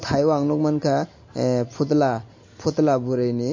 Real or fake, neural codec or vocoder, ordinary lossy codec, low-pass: real; none; MP3, 32 kbps; 7.2 kHz